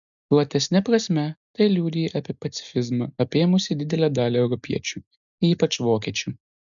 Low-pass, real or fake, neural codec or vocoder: 7.2 kHz; real; none